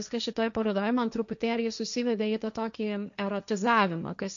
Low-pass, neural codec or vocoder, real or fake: 7.2 kHz; codec, 16 kHz, 1.1 kbps, Voila-Tokenizer; fake